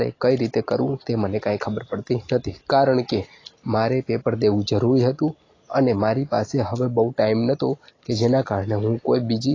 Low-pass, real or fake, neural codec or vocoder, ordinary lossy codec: 7.2 kHz; real; none; AAC, 32 kbps